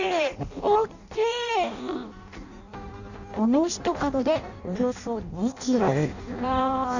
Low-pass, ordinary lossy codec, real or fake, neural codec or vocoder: 7.2 kHz; none; fake; codec, 16 kHz in and 24 kHz out, 0.6 kbps, FireRedTTS-2 codec